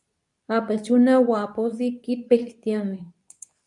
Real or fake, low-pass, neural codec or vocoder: fake; 10.8 kHz; codec, 24 kHz, 0.9 kbps, WavTokenizer, medium speech release version 2